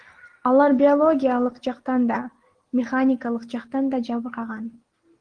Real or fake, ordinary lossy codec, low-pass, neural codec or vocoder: real; Opus, 16 kbps; 9.9 kHz; none